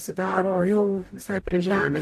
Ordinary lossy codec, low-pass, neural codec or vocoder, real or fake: AAC, 64 kbps; 14.4 kHz; codec, 44.1 kHz, 0.9 kbps, DAC; fake